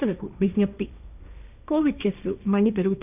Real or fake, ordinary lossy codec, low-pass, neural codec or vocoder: fake; none; 3.6 kHz; codec, 16 kHz, 1.1 kbps, Voila-Tokenizer